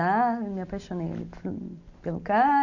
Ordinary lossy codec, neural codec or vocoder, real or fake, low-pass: none; none; real; 7.2 kHz